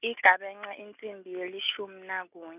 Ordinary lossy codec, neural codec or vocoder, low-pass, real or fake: none; none; 3.6 kHz; real